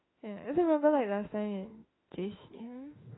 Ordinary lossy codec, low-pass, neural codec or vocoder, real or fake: AAC, 16 kbps; 7.2 kHz; autoencoder, 48 kHz, 32 numbers a frame, DAC-VAE, trained on Japanese speech; fake